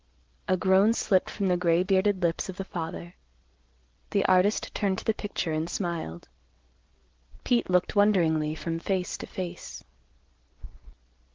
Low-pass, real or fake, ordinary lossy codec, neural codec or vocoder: 7.2 kHz; real; Opus, 16 kbps; none